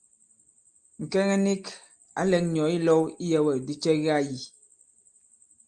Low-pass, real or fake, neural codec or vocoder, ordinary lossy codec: 9.9 kHz; real; none; Opus, 32 kbps